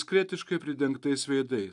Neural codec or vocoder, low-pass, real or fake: none; 10.8 kHz; real